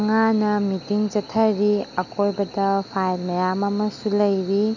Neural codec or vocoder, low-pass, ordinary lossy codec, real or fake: none; 7.2 kHz; none; real